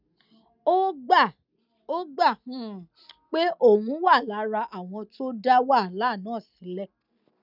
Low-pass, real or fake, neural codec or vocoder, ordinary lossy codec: 5.4 kHz; real; none; none